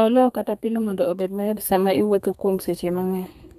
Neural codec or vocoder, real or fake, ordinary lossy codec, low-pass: codec, 32 kHz, 1.9 kbps, SNAC; fake; none; 14.4 kHz